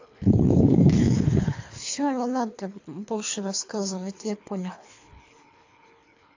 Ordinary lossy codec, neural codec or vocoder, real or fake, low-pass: AAC, 48 kbps; codec, 24 kHz, 3 kbps, HILCodec; fake; 7.2 kHz